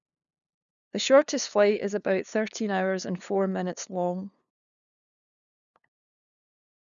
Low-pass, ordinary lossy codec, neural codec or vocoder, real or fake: 7.2 kHz; none; codec, 16 kHz, 2 kbps, FunCodec, trained on LibriTTS, 25 frames a second; fake